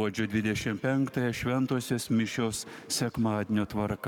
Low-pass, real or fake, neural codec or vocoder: 19.8 kHz; fake; codec, 44.1 kHz, 7.8 kbps, Pupu-Codec